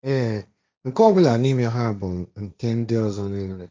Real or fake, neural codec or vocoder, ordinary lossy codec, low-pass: fake; codec, 16 kHz, 1.1 kbps, Voila-Tokenizer; none; none